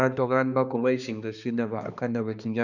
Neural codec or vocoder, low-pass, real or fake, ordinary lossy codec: codec, 16 kHz, 2 kbps, X-Codec, HuBERT features, trained on balanced general audio; 7.2 kHz; fake; none